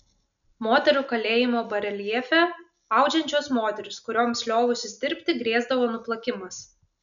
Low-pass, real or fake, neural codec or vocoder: 7.2 kHz; real; none